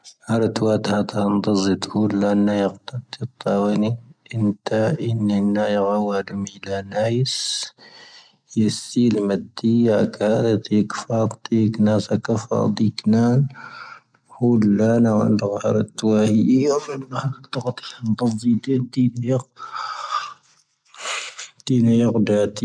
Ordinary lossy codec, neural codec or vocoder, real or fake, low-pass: MP3, 96 kbps; none; real; 9.9 kHz